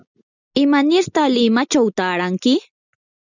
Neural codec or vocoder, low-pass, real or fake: none; 7.2 kHz; real